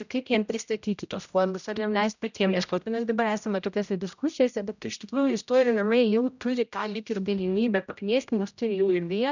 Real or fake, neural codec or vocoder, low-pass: fake; codec, 16 kHz, 0.5 kbps, X-Codec, HuBERT features, trained on general audio; 7.2 kHz